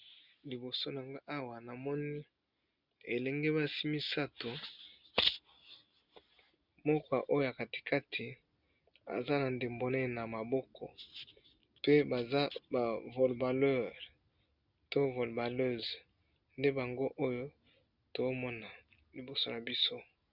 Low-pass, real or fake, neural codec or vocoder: 5.4 kHz; real; none